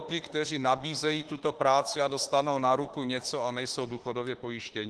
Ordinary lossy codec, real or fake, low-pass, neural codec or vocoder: Opus, 16 kbps; fake; 10.8 kHz; autoencoder, 48 kHz, 32 numbers a frame, DAC-VAE, trained on Japanese speech